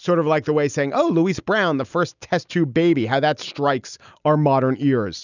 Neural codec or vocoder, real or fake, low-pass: none; real; 7.2 kHz